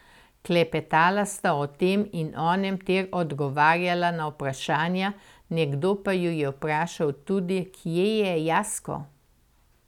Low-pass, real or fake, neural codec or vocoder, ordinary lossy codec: 19.8 kHz; real; none; none